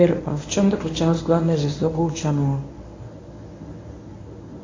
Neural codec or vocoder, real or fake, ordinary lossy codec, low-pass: codec, 24 kHz, 0.9 kbps, WavTokenizer, medium speech release version 1; fake; AAC, 32 kbps; 7.2 kHz